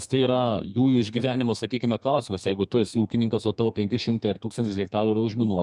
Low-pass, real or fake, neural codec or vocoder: 10.8 kHz; fake; codec, 32 kHz, 1.9 kbps, SNAC